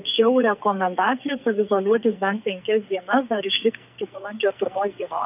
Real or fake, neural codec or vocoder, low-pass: fake; codec, 44.1 kHz, 2.6 kbps, SNAC; 3.6 kHz